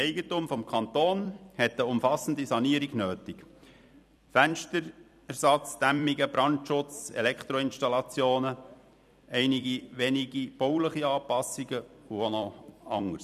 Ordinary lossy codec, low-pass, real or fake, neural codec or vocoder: none; 14.4 kHz; fake; vocoder, 44.1 kHz, 128 mel bands every 256 samples, BigVGAN v2